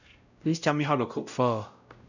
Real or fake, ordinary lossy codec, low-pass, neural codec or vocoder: fake; none; 7.2 kHz; codec, 16 kHz, 0.5 kbps, X-Codec, WavLM features, trained on Multilingual LibriSpeech